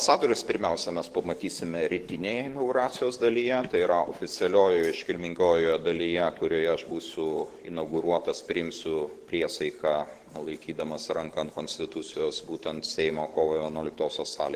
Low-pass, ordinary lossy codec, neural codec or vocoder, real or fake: 14.4 kHz; Opus, 16 kbps; codec, 44.1 kHz, 7.8 kbps, DAC; fake